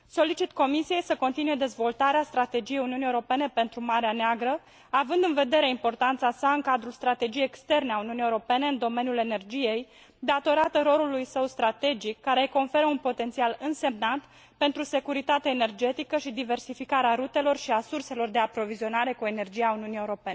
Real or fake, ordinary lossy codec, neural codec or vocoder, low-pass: real; none; none; none